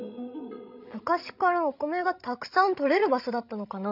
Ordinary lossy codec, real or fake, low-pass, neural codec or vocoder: none; fake; 5.4 kHz; codec, 16 kHz, 16 kbps, FreqCodec, larger model